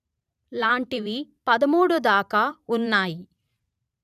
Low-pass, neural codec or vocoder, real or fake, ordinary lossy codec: 14.4 kHz; vocoder, 44.1 kHz, 128 mel bands every 512 samples, BigVGAN v2; fake; none